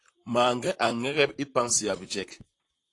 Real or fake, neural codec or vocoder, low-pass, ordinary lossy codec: fake; vocoder, 44.1 kHz, 128 mel bands, Pupu-Vocoder; 10.8 kHz; AAC, 48 kbps